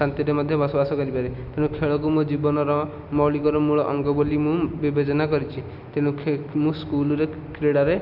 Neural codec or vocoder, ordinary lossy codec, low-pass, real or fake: none; none; 5.4 kHz; real